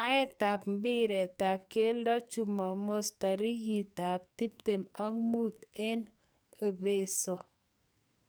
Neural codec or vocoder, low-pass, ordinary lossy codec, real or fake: codec, 44.1 kHz, 2.6 kbps, SNAC; none; none; fake